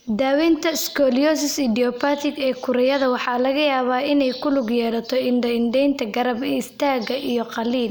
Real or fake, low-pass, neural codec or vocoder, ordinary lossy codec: real; none; none; none